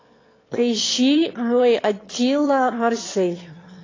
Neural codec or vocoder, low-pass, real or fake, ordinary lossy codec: autoencoder, 22.05 kHz, a latent of 192 numbers a frame, VITS, trained on one speaker; 7.2 kHz; fake; AAC, 32 kbps